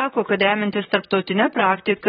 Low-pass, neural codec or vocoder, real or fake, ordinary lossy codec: 19.8 kHz; vocoder, 44.1 kHz, 128 mel bands, Pupu-Vocoder; fake; AAC, 16 kbps